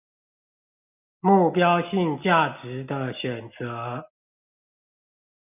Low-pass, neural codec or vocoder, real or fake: 3.6 kHz; none; real